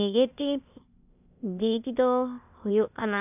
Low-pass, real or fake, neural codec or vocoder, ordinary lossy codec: 3.6 kHz; fake; codec, 16 kHz, 0.8 kbps, ZipCodec; none